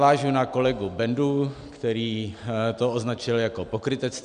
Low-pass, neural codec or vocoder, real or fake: 9.9 kHz; none; real